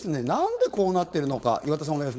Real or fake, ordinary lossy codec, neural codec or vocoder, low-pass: fake; none; codec, 16 kHz, 4.8 kbps, FACodec; none